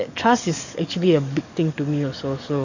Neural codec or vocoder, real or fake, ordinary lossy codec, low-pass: codec, 44.1 kHz, 7.8 kbps, DAC; fake; none; 7.2 kHz